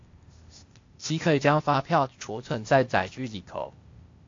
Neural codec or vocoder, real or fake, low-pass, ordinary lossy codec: codec, 16 kHz, 0.8 kbps, ZipCodec; fake; 7.2 kHz; AAC, 48 kbps